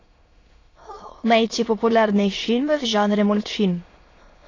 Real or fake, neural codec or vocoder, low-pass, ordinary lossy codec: fake; autoencoder, 22.05 kHz, a latent of 192 numbers a frame, VITS, trained on many speakers; 7.2 kHz; AAC, 32 kbps